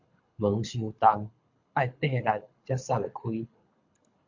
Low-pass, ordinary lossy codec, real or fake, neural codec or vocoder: 7.2 kHz; MP3, 48 kbps; fake; codec, 24 kHz, 6 kbps, HILCodec